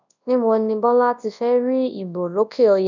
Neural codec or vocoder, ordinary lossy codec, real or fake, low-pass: codec, 24 kHz, 0.9 kbps, WavTokenizer, large speech release; none; fake; 7.2 kHz